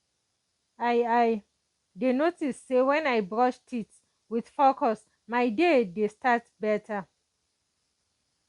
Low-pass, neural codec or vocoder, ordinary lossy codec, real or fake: 10.8 kHz; none; Opus, 64 kbps; real